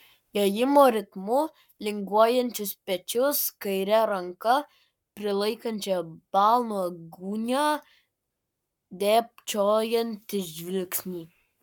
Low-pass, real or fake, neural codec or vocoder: 19.8 kHz; fake; codec, 44.1 kHz, 7.8 kbps, Pupu-Codec